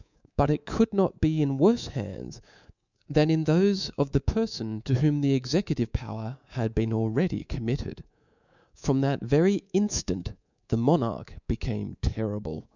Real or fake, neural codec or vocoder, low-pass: fake; codec, 24 kHz, 3.1 kbps, DualCodec; 7.2 kHz